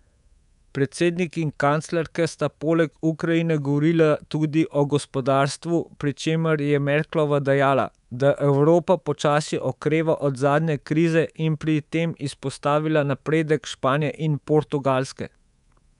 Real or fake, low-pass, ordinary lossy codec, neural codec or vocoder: fake; 10.8 kHz; none; codec, 24 kHz, 3.1 kbps, DualCodec